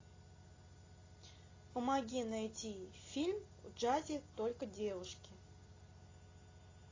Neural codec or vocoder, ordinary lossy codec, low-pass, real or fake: none; AAC, 32 kbps; 7.2 kHz; real